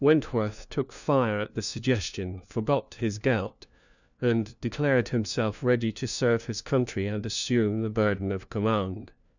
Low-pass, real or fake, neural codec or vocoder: 7.2 kHz; fake; codec, 16 kHz, 1 kbps, FunCodec, trained on LibriTTS, 50 frames a second